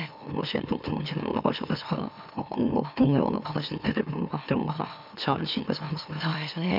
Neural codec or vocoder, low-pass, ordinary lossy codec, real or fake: autoencoder, 44.1 kHz, a latent of 192 numbers a frame, MeloTTS; 5.4 kHz; none; fake